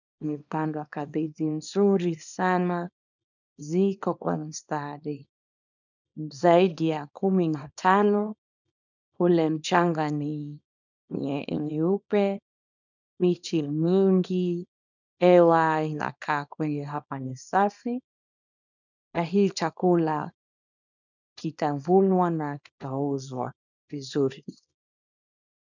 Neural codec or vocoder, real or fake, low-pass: codec, 24 kHz, 0.9 kbps, WavTokenizer, small release; fake; 7.2 kHz